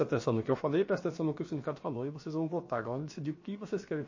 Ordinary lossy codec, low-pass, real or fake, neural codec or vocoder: MP3, 32 kbps; 7.2 kHz; fake; codec, 16 kHz, about 1 kbps, DyCAST, with the encoder's durations